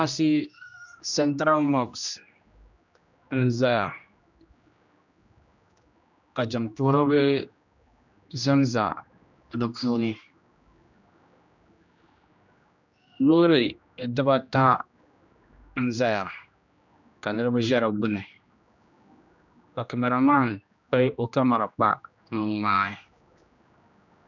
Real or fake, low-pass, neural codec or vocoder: fake; 7.2 kHz; codec, 16 kHz, 1 kbps, X-Codec, HuBERT features, trained on general audio